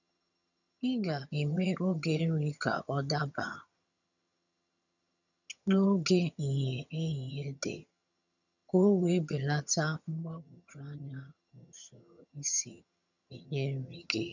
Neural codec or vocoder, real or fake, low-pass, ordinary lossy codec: vocoder, 22.05 kHz, 80 mel bands, HiFi-GAN; fake; 7.2 kHz; none